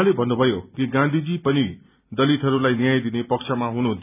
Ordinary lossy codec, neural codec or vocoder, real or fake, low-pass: none; none; real; 3.6 kHz